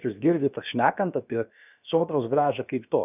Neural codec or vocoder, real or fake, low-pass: codec, 16 kHz, about 1 kbps, DyCAST, with the encoder's durations; fake; 3.6 kHz